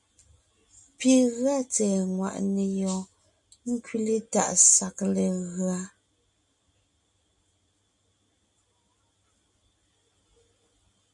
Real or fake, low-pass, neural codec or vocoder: real; 10.8 kHz; none